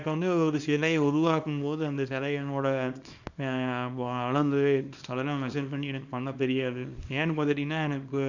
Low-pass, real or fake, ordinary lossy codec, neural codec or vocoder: 7.2 kHz; fake; none; codec, 24 kHz, 0.9 kbps, WavTokenizer, small release